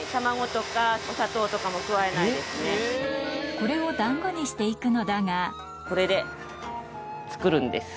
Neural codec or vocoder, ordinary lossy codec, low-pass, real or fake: none; none; none; real